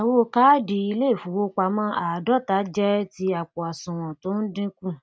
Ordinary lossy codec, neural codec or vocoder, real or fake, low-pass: none; none; real; none